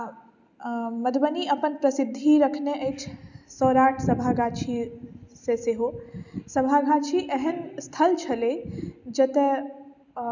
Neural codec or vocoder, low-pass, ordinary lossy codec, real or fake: none; 7.2 kHz; none; real